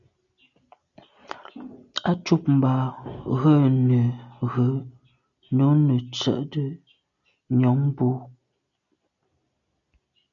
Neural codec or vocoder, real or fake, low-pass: none; real; 7.2 kHz